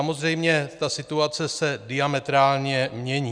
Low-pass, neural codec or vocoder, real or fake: 9.9 kHz; none; real